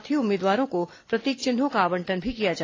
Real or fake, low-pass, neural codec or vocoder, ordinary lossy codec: real; 7.2 kHz; none; AAC, 32 kbps